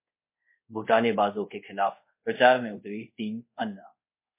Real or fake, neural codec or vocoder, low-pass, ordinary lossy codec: fake; codec, 24 kHz, 0.5 kbps, DualCodec; 3.6 kHz; MP3, 24 kbps